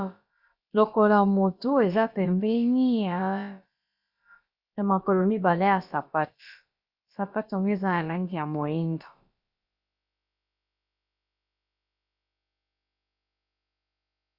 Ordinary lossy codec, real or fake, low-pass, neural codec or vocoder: Opus, 64 kbps; fake; 5.4 kHz; codec, 16 kHz, about 1 kbps, DyCAST, with the encoder's durations